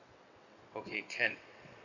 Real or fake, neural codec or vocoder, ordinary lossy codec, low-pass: fake; vocoder, 22.05 kHz, 80 mel bands, WaveNeXt; none; 7.2 kHz